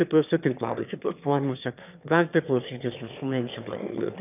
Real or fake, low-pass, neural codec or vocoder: fake; 3.6 kHz; autoencoder, 22.05 kHz, a latent of 192 numbers a frame, VITS, trained on one speaker